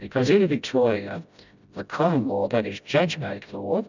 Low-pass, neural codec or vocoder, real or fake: 7.2 kHz; codec, 16 kHz, 0.5 kbps, FreqCodec, smaller model; fake